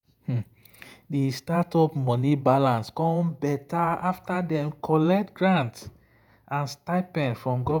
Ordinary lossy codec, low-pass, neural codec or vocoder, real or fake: none; none; vocoder, 48 kHz, 128 mel bands, Vocos; fake